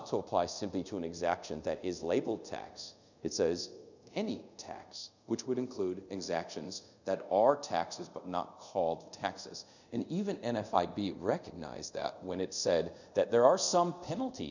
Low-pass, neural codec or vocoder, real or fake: 7.2 kHz; codec, 24 kHz, 0.5 kbps, DualCodec; fake